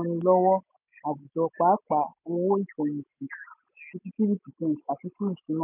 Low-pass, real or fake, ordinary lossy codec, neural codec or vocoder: 3.6 kHz; real; none; none